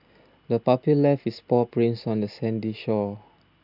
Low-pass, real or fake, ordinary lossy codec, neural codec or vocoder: 5.4 kHz; real; none; none